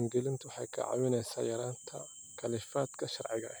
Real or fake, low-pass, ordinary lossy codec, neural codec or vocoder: real; none; none; none